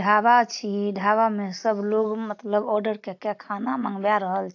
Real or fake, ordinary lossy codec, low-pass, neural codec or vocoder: real; AAC, 48 kbps; 7.2 kHz; none